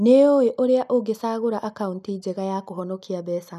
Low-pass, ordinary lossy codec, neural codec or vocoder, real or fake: 14.4 kHz; none; none; real